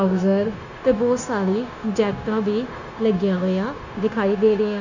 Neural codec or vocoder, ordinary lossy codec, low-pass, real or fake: codec, 16 kHz, 0.9 kbps, LongCat-Audio-Codec; none; 7.2 kHz; fake